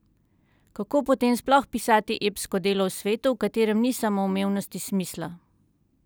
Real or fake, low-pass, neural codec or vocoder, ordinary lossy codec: real; none; none; none